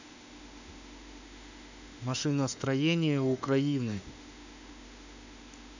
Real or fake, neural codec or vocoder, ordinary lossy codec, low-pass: fake; autoencoder, 48 kHz, 32 numbers a frame, DAC-VAE, trained on Japanese speech; none; 7.2 kHz